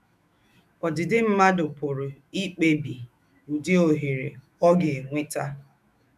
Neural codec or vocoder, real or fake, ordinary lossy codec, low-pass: autoencoder, 48 kHz, 128 numbers a frame, DAC-VAE, trained on Japanese speech; fake; none; 14.4 kHz